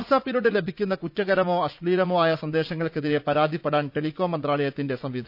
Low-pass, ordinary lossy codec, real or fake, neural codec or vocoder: 5.4 kHz; none; fake; vocoder, 44.1 kHz, 80 mel bands, Vocos